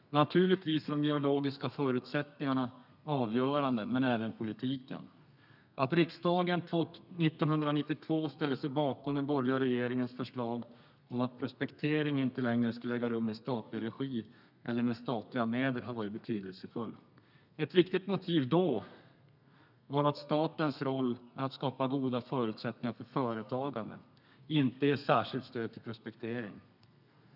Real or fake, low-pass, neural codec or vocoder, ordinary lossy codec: fake; 5.4 kHz; codec, 32 kHz, 1.9 kbps, SNAC; none